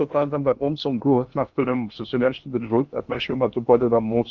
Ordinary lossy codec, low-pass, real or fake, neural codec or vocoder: Opus, 32 kbps; 7.2 kHz; fake; codec, 16 kHz in and 24 kHz out, 0.6 kbps, FocalCodec, streaming, 2048 codes